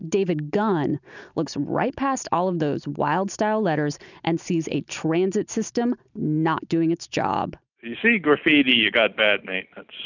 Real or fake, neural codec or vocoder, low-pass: real; none; 7.2 kHz